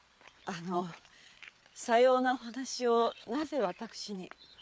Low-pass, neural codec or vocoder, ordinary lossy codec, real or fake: none; codec, 16 kHz, 4 kbps, FreqCodec, larger model; none; fake